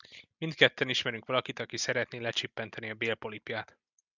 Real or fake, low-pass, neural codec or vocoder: real; 7.2 kHz; none